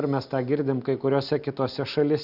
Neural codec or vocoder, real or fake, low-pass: none; real; 5.4 kHz